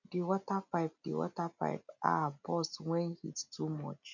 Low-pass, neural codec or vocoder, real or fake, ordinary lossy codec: 7.2 kHz; none; real; none